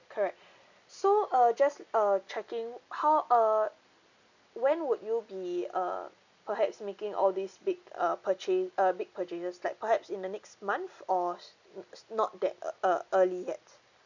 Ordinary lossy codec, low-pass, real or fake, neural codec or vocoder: none; 7.2 kHz; real; none